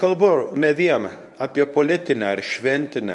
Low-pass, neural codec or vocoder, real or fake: 10.8 kHz; codec, 24 kHz, 0.9 kbps, WavTokenizer, medium speech release version 1; fake